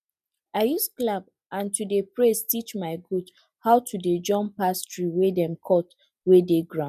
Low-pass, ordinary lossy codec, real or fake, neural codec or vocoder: 14.4 kHz; none; real; none